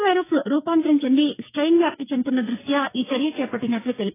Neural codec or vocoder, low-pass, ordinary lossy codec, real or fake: codec, 44.1 kHz, 2.6 kbps, SNAC; 3.6 kHz; AAC, 16 kbps; fake